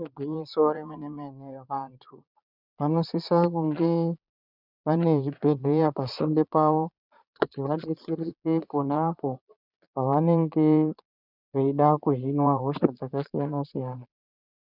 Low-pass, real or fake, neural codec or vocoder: 5.4 kHz; fake; vocoder, 22.05 kHz, 80 mel bands, Vocos